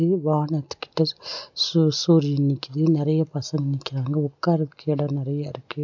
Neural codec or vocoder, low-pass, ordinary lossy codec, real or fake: none; 7.2 kHz; none; real